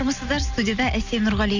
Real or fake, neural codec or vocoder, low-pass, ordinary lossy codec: real; none; 7.2 kHz; none